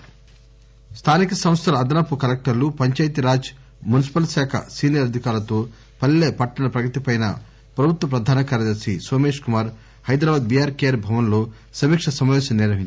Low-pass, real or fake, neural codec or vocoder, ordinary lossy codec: none; real; none; none